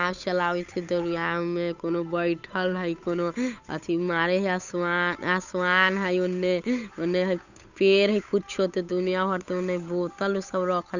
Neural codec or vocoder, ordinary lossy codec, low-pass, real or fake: codec, 16 kHz, 8 kbps, FunCodec, trained on Chinese and English, 25 frames a second; none; 7.2 kHz; fake